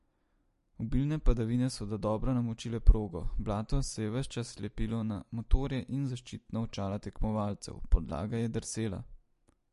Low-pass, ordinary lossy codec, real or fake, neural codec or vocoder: 14.4 kHz; MP3, 48 kbps; real; none